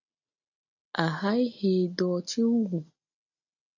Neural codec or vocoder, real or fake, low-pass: none; real; 7.2 kHz